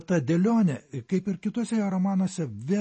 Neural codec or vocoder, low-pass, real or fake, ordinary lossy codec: none; 10.8 kHz; real; MP3, 32 kbps